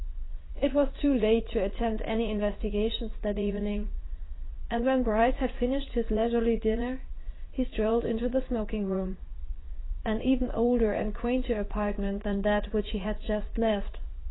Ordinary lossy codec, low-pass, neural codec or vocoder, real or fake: AAC, 16 kbps; 7.2 kHz; vocoder, 44.1 kHz, 80 mel bands, Vocos; fake